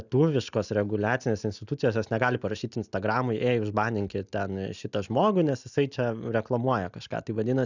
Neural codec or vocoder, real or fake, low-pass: none; real; 7.2 kHz